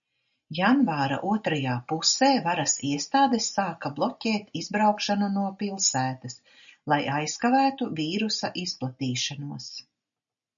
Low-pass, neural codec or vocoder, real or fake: 7.2 kHz; none; real